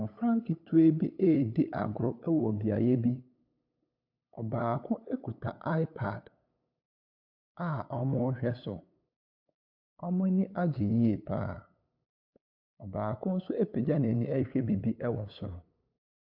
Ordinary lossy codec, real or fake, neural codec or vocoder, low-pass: AAC, 48 kbps; fake; codec, 16 kHz, 8 kbps, FunCodec, trained on LibriTTS, 25 frames a second; 5.4 kHz